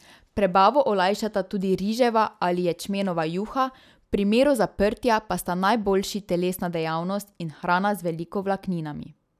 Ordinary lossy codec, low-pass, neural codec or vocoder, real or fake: none; 14.4 kHz; none; real